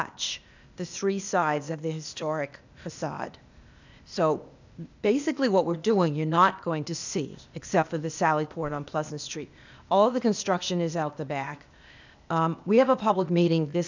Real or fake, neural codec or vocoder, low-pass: fake; codec, 16 kHz, 0.8 kbps, ZipCodec; 7.2 kHz